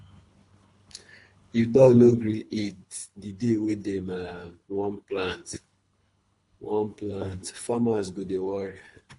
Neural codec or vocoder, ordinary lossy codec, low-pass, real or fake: codec, 24 kHz, 3 kbps, HILCodec; AAC, 48 kbps; 10.8 kHz; fake